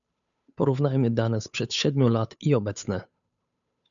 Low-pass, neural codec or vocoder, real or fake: 7.2 kHz; codec, 16 kHz, 8 kbps, FunCodec, trained on Chinese and English, 25 frames a second; fake